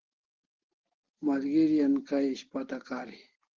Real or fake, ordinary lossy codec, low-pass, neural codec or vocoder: real; Opus, 16 kbps; 7.2 kHz; none